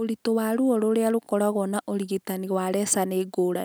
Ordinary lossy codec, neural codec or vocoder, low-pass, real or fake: none; none; none; real